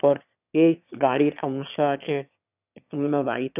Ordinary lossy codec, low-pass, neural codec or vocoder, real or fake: none; 3.6 kHz; autoencoder, 22.05 kHz, a latent of 192 numbers a frame, VITS, trained on one speaker; fake